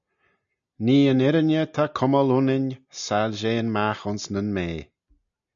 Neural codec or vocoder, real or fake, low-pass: none; real; 7.2 kHz